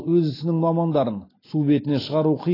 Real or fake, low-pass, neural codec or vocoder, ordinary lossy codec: real; 5.4 kHz; none; AAC, 24 kbps